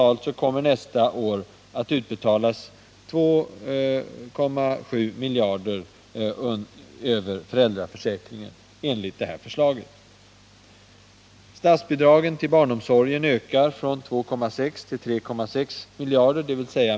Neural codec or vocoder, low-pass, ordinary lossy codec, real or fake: none; none; none; real